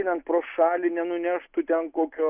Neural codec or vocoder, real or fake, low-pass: none; real; 3.6 kHz